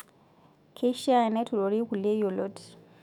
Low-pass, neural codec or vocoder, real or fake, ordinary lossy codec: 19.8 kHz; autoencoder, 48 kHz, 128 numbers a frame, DAC-VAE, trained on Japanese speech; fake; none